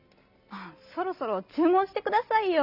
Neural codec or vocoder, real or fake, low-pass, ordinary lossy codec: none; real; 5.4 kHz; none